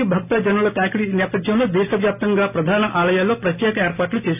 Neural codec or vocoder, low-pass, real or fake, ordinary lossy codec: none; 3.6 kHz; real; none